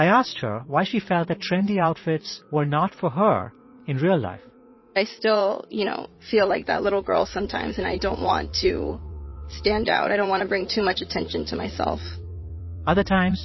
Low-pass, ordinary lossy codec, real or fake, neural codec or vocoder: 7.2 kHz; MP3, 24 kbps; fake; vocoder, 44.1 kHz, 80 mel bands, Vocos